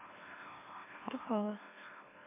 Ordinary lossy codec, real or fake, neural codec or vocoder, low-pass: MP3, 16 kbps; fake; autoencoder, 44.1 kHz, a latent of 192 numbers a frame, MeloTTS; 3.6 kHz